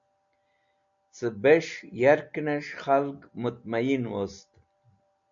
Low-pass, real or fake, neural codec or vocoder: 7.2 kHz; real; none